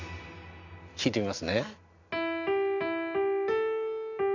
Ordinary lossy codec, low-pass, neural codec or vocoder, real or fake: none; 7.2 kHz; none; real